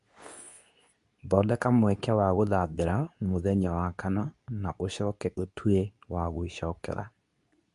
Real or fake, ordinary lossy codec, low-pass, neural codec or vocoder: fake; MP3, 64 kbps; 10.8 kHz; codec, 24 kHz, 0.9 kbps, WavTokenizer, medium speech release version 2